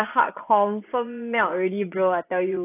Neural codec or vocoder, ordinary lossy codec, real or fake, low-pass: vocoder, 24 kHz, 100 mel bands, Vocos; AAC, 24 kbps; fake; 3.6 kHz